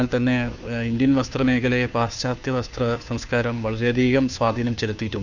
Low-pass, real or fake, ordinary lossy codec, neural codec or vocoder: 7.2 kHz; fake; none; codec, 16 kHz, 2 kbps, FunCodec, trained on Chinese and English, 25 frames a second